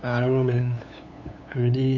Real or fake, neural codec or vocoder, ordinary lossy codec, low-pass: fake; codec, 16 kHz, 8 kbps, FunCodec, trained on LibriTTS, 25 frames a second; none; 7.2 kHz